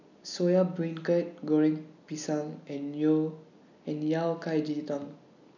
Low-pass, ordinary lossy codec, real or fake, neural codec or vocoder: 7.2 kHz; none; real; none